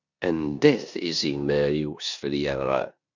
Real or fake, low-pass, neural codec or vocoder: fake; 7.2 kHz; codec, 16 kHz in and 24 kHz out, 0.9 kbps, LongCat-Audio-Codec, four codebook decoder